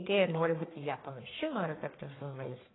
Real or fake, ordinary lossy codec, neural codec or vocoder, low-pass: fake; AAC, 16 kbps; codec, 44.1 kHz, 1.7 kbps, Pupu-Codec; 7.2 kHz